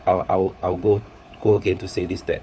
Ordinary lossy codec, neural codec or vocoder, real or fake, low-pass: none; codec, 16 kHz, 4 kbps, FunCodec, trained on LibriTTS, 50 frames a second; fake; none